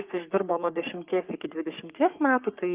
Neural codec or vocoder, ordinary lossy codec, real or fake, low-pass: codec, 44.1 kHz, 3.4 kbps, Pupu-Codec; Opus, 24 kbps; fake; 3.6 kHz